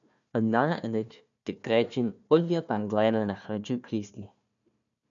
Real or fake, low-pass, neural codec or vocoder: fake; 7.2 kHz; codec, 16 kHz, 1 kbps, FunCodec, trained on Chinese and English, 50 frames a second